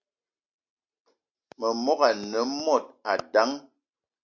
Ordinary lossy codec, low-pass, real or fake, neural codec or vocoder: MP3, 64 kbps; 7.2 kHz; real; none